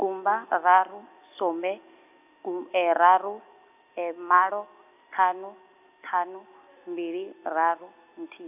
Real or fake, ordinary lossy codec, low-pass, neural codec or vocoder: real; AAC, 32 kbps; 3.6 kHz; none